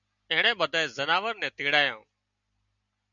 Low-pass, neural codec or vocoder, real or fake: 7.2 kHz; none; real